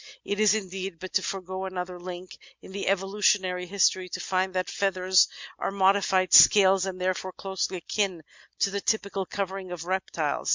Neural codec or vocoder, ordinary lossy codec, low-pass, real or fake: none; MP3, 64 kbps; 7.2 kHz; real